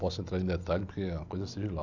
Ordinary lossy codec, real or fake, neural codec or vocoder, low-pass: none; real; none; 7.2 kHz